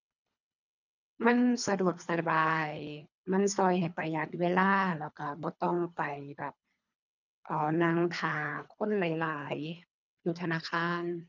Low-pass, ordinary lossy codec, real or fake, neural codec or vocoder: 7.2 kHz; none; fake; codec, 24 kHz, 3 kbps, HILCodec